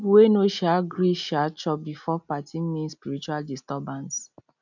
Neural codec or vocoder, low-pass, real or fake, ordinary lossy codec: none; 7.2 kHz; real; none